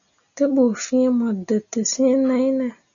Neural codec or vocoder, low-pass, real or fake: none; 7.2 kHz; real